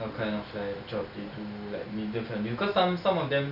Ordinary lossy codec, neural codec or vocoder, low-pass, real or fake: none; none; 5.4 kHz; real